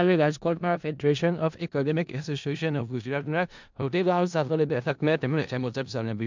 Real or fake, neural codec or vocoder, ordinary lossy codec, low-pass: fake; codec, 16 kHz in and 24 kHz out, 0.4 kbps, LongCat-Audio-Codec, four codebook decoder; MP3, 64 kbps; 7.2 kHz